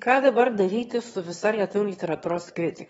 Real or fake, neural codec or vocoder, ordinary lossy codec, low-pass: fake; autoencoder, 22.05 kHz, a latent of 192 numbers a frame, VITS, trained on one speaker; AAC, 24 kbps; 9.9 kHz